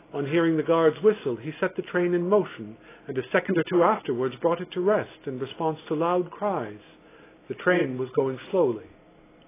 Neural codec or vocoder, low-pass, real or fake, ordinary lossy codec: none; 3.6 kHz; real; AAC, 16 kbps